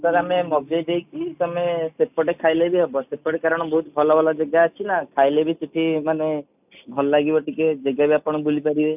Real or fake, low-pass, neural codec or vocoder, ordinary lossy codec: real; 3.6 kHz; none; none